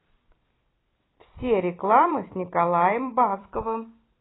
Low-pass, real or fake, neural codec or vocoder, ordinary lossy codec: 7.2 kHz; fake; vocoder, 44.1 kHz, 128 mel bands every 256 samples, BigVGAN v2; AAC, 16 kbps